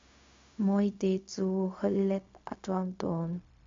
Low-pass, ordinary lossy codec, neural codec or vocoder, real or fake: 7.2 kHz; MP3, 48 kbps; codec, 16 kHz, 0.4 kbps, LongCat-Audio-Codec; fake